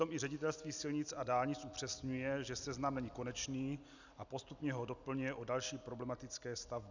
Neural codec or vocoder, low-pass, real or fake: none; 7.2 kHz; real